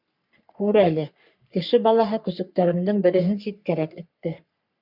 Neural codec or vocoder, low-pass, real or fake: codec, 44.1 kHz, 3.4 kbps, Pupu-Codec; 5.4 kHz; fake